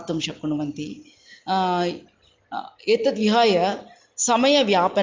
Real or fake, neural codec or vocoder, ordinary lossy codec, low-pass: real; none; Opus, 32 kbps; 7.2 kHz